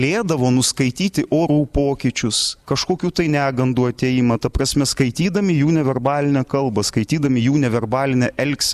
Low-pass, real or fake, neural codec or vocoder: 14.4 kHz; real; none